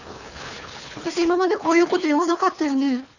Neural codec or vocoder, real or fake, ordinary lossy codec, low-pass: codec, 24 kHz, 3 kbps, HILCodec; fake; none; 7.2 kHz